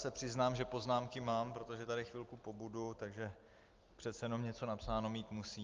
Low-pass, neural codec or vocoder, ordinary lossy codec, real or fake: 7.2 kHz; none; Opus, 32 kbps; real